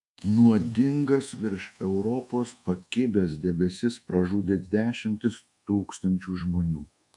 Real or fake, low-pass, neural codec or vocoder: fake; 10.8 kHz; codec, 24 kHz, 1.2 kbps, DualCodec